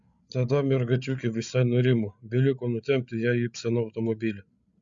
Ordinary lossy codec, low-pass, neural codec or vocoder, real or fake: Opus, 64 kbps; 7.2 kHz; codec, 16 kHz, 8 kbps, FreqCodec, larger model; fake